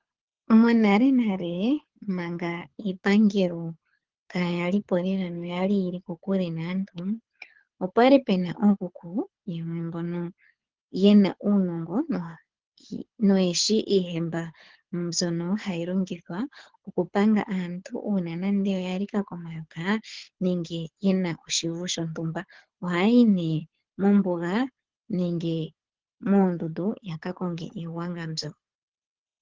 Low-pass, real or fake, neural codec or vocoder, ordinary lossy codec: 7.2 kHz; fake; codec, 24 kHz, 6 kbps, HILCodec; Opus, 16 kbps